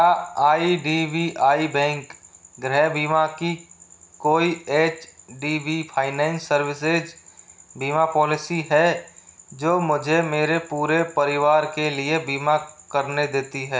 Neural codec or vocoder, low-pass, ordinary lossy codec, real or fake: none; none; none; real